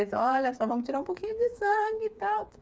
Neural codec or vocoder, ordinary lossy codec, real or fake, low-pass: codec, 16 kHz, 4 kbps, FreqCodec, smaller model; none; fake; none